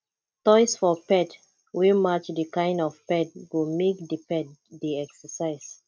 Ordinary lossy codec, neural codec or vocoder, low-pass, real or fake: none; none; none; real